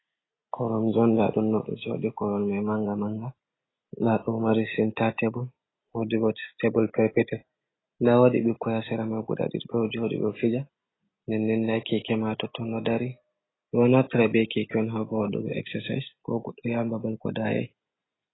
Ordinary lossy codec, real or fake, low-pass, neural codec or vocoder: AAC, 16 kbps; real; 7.2 kHz; none